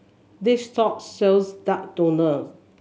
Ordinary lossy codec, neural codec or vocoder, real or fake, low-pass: none; none; real; none